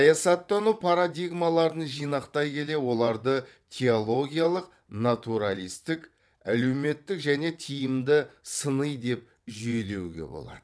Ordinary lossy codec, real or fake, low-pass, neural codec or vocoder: none; fake; none; vocoder, 22.05 kHz, 80 mel bands, WaveNeXt